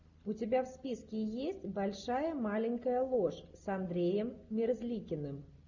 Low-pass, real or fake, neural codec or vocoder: 7.2 kHz; real; none